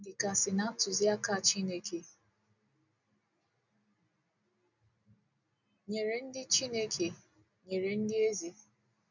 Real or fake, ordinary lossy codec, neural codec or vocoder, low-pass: real; none; none; 7.2 kHz